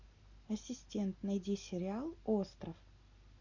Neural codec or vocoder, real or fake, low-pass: none; real; 7.2 kHz